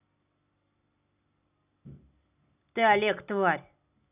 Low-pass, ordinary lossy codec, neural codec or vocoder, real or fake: 3.6 kHz; none; none; real